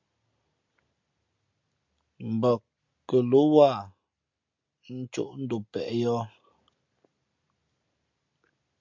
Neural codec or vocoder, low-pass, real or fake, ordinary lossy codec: none; 7.2 kHz; real; MP3, 64 kbps